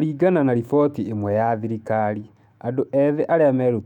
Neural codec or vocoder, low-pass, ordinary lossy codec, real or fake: autoencoder, 48 kHz, 128 numbers a frame, DAC-VAE, trained on Japanese speech; 19.8 kHz; none; fake